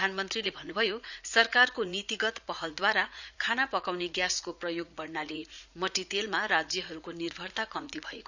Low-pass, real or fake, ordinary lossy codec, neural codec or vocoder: 7.2 kHz; fake; none; vocoder, 44.1 kHz, 80 mel bands, Vocos